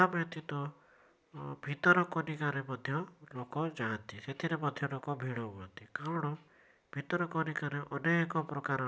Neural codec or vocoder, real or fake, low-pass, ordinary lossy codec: none; real; none; none